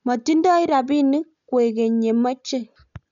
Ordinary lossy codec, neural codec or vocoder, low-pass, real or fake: MP3, 96 kbps; none; 7.2 kHz; real